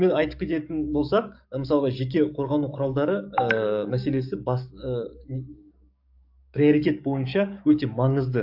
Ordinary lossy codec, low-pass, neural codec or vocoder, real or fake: AAC, 48 kbps; 5.4 kHz; codec, 44.1 kHz, 7.8 kbps, DAC; fake